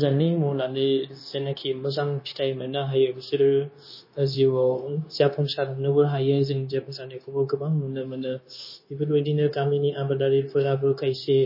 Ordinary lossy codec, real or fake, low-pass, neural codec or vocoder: MP3, 24 kbps; fake; 5.4 kHz; codec, 16 kHz, 0.9 kbps, LongCat-Audio-Codec